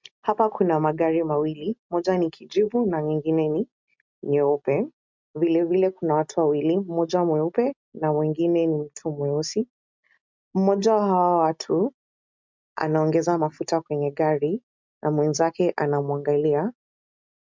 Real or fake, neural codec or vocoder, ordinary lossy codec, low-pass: real; none; MP3, 64 kbps; 7.2 kHz